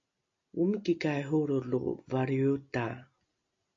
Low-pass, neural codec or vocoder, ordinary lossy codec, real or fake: 7.2 kHz; none; AAC, 48 kbps; real